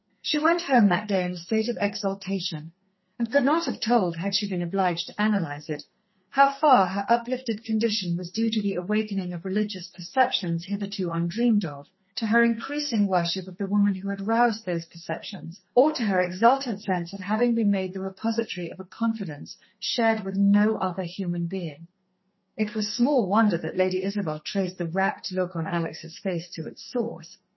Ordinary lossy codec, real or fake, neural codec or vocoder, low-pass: MP3, 24 kbps; fake; codec, 44.1 kHz, 2.6 kbps, SNAC; 7.2 kHz